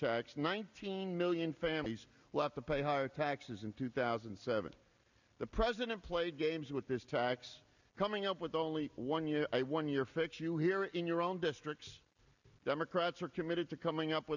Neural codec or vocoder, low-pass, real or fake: none; 7.2 kHz; real